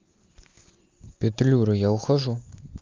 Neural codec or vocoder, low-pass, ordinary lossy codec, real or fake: none; 7.2 kHz; Opus, 24 kbps; real